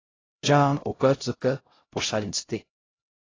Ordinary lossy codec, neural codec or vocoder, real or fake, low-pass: AAC, 32 kbps; codec, 16 kHz, 0.5 kbps, X-Codec, HuBERT features, trained on LibriSpeech; fake; 7.2 kHz